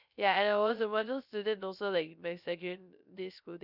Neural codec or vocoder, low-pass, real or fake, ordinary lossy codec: codec, 16 kHz, 0.3 kbps, FocalCodec; 5.4 kHz; fake; none